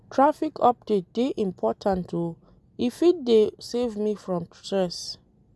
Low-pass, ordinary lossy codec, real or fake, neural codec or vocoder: none; none; real; none